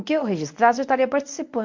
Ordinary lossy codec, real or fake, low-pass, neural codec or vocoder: MP3, 64 kbps; fake; 7.2 kHz; codec, 24 kHz, 0.9 kbps, WavTokenizer, medium speech release version 1